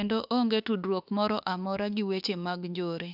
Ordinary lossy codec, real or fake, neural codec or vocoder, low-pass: none; fake; autoencoder, 48 kHz, 32 numbers a frame, DAC-VAE, trained on Japanese speech; 5.4 kHz